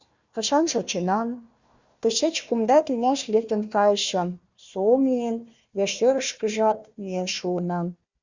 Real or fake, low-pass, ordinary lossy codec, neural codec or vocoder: fake; 7.2 kHz; Opus, 64 kbps; codec, 16 kHz, 1 kbps, FunCodec, trained on Chinese and English, 50 frames a second